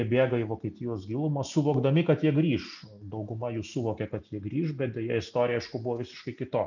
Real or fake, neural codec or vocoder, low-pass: real; none; 7.2 kHz